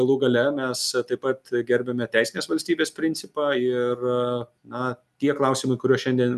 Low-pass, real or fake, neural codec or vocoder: 14.4 kHz; real; none